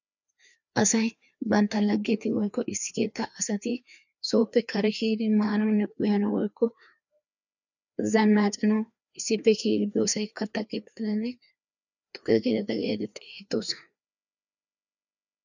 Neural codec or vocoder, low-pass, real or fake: codec, 16 kHz, 2 kbps, FreqCodec, larger model; 7.2 kHz; fake